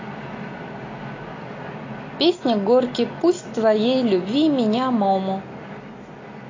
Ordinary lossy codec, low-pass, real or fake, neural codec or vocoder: AAC, 32 kbps; 7.2 kHz; real; none